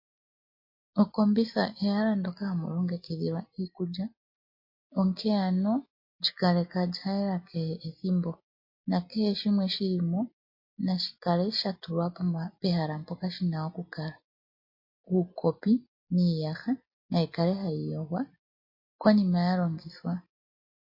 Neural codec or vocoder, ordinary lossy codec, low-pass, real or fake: none; MP3, 32 kbps; 5.4 kHz; real